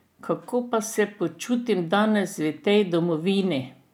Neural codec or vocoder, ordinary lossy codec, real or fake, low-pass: none; none; real; 19.8 kHz